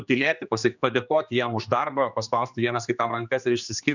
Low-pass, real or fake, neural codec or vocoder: 7.2 kHz; fake; codec, 16 kHz, 2 kbps, X-Codec, HuBERT features, trained on general audio